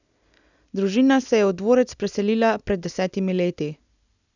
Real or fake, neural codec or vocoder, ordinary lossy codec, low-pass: real; none; none; 7.2 kHz